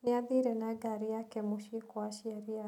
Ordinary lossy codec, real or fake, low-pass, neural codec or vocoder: none; real; 19.8 kHz; none